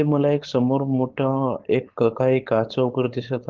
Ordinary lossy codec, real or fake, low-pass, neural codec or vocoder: Opus, 16 kbps; fake; 7.2 kHz; codec, 16 kHz, 4.8 kbps, FACodec